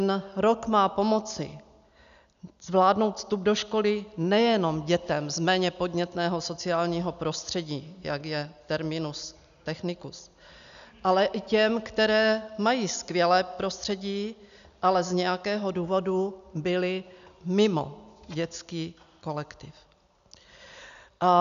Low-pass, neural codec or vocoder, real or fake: 7.2 kHz; none; real